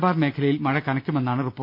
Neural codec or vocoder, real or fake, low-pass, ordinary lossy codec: none; real; 5.4 kHz; none